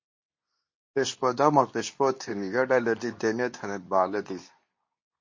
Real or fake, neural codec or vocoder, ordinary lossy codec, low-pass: fake; codec, 24 kHz, 0.9 kbps, WavTokenizer, medium speech release version 2; MP3, 32 kbps; 7.2 kHz